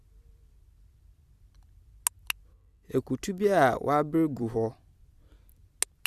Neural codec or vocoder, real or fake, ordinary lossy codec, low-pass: vocoder, 44.1 kHz, 128 mel bands every 256 samples, BigVGAN v2; fake; Opus, 64 kbps; 14.4 kHz